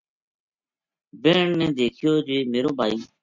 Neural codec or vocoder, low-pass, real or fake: none; 7.2 kHz; real